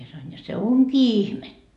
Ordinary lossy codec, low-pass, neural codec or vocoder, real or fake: AAC, 96 kbps; 10.8 kHz; none; real